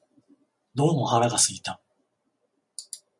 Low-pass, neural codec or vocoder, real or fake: 10.8 kHz; none; real